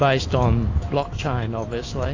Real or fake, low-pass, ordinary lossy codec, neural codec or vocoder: fake; 7.2 kHz; AAC, 32 kbps; autoencoder, 48 kHz, 128 numbers a frame, DAC-VAE, trained on Japanese speech